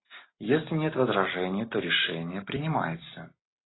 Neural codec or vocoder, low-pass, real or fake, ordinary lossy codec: none; 7.2 kHz; real; AAC, 16 kbps